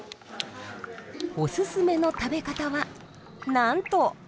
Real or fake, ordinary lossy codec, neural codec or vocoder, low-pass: real; none; none; none